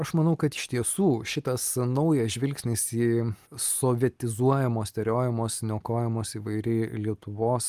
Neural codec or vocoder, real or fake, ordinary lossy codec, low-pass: none; real; Opus, 32 kbps; 14.4 kHz